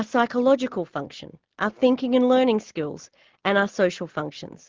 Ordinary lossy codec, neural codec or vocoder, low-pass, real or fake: Opus, 16 kbps; none; 7.2 kHz; real